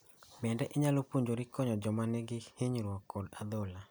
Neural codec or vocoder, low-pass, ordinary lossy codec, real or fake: none; none; none; real